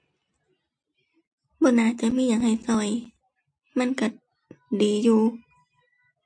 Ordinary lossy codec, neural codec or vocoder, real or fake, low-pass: MP3, 48 kbps; none; real; 9.9 kHz